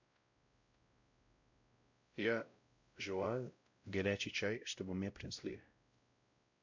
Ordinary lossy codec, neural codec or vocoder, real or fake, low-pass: MP3, 64 kbps; codec, 16 kHz, 0.5 kbps, X-Codec, WavLM features, trained on Multilingual LibriSpeech; fake; 7.2 kHz